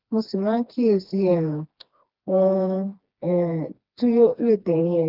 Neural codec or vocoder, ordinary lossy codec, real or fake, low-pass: codec, 16 kHz, 2 kbps, FreqCodec, smaller model; Opus, 32 kbps; fake; 5.4 kHz